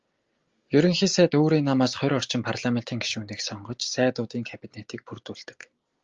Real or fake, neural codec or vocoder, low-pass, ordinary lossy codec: real; none; 7.2 kHz; Opus, 32 kbps